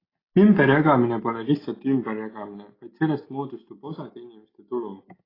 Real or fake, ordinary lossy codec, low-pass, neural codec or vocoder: real; AAC, 24 kbps; 5.4 kHz; none